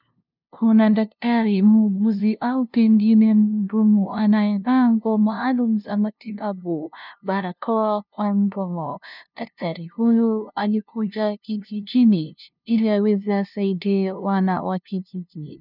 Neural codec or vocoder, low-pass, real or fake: codec, 16 kHz, 0.5 kbps, FunCodec, trained on LibriTTS, 25 frames a second; 5.4 kHz; fake